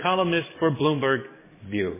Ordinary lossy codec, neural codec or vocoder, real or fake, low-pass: MP3, 16 kbps; codec, 44.1 kHz, 7.8 kbps, DAC; fake; 3.6 kHz